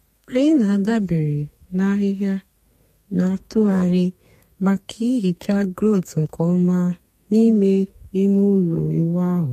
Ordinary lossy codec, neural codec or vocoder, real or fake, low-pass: MP3, 64 kbps; codec, 32 kHz, 1.9 kbps, SNAC; fake; 14.4 kHz